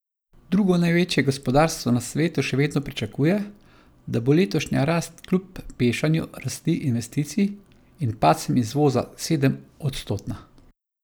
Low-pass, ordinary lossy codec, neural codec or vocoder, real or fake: none; none; none; real